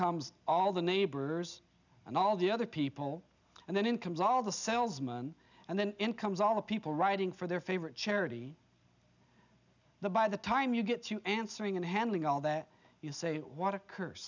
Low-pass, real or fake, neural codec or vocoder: 7.2 kHz; real; none